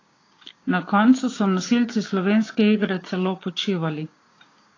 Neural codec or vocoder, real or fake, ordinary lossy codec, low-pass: codec, 16 kHz, 6 kbps, DAC; fake; AAC, 32 kbps; 7.2 kHz